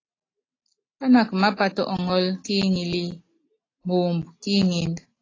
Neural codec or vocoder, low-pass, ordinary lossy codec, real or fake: none; 7.2 kHz; AAC, 32 kbps; real